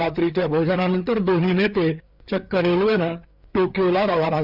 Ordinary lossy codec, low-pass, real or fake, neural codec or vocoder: Opus, 64 kbps; 5.4 kHz; fake; codec, 16 kHz, 4 kbps, FreqCodec, larger model